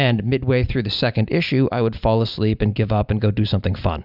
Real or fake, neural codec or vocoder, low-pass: real; none; 5.4 kHz